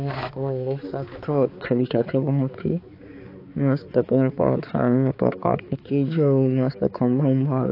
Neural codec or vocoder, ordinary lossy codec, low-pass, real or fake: codec, 16 kHz, 4 kbps, X-Codec, HuBERT features, trained on balanced general audio; AAC, 32 kbps; 5.4 kHz; fake